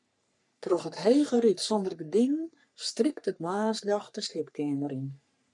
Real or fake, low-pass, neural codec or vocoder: fake; 10.8 kHz; codec, 44.1 kHz, 3.4 kbps, Pupu-Codec